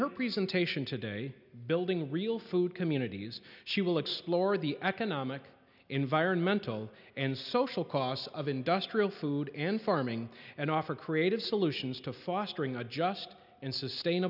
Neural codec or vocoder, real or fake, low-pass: none; real; 5.4 kHz